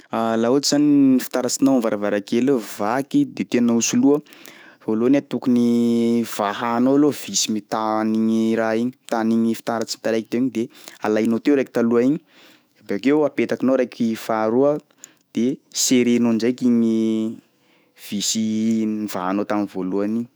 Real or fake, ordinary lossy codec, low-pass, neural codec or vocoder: fake; none; none; autoencoder, 48 kHz, 128 numbers a frame, DAC-VAE, trained on Japanese speech